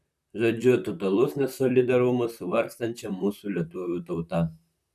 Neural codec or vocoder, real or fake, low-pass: vocoder, 44.1 kHz, 128 mel bands, Pupu-Vocoder; fake; 14.4 kHz